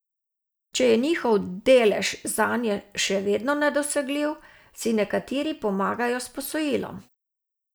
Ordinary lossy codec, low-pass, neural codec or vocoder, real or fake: none; none; none; real